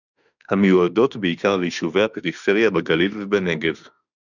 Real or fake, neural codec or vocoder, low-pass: fake; autoencoder, 48 kHz, 32 numbers a frame, DAC-VAE, trained on Japanese speech; 7.2 kHz